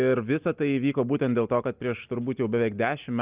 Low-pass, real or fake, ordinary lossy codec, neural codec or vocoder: 3.6 kHz; real; Opus, 32 kbps; none